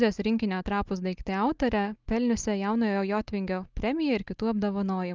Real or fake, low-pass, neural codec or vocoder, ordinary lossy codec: real; 7.2 kHz; none; Opus, 32 kbps